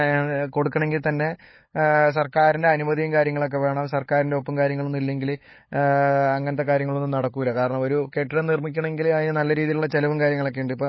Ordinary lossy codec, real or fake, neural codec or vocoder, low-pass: MP3, 24 kbps; fake; codec, 16 kHz, 8 kbps, FunCodec, trained on LibriTTS, 25 frames a second; 7.2 kHz